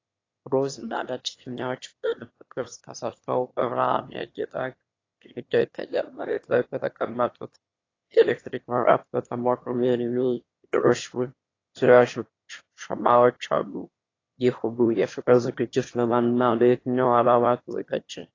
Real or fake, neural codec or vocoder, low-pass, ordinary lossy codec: fake; autoencoder, 22.05 kHz, a latent of 192 numbers a frame, VITS, trained on one speaker; 7.2 kHz; AAC, 32 kbps